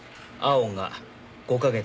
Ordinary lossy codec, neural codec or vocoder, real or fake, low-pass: none; none; real; none